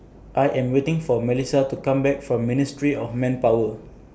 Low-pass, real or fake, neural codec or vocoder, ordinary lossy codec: none; real; none; none